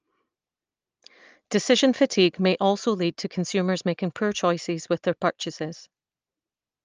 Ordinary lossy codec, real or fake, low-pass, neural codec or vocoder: Opus, 24 kbps; real; 7.2 kHz; none